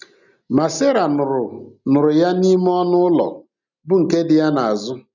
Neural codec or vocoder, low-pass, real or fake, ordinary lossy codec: none; 7.2 kHz; real; none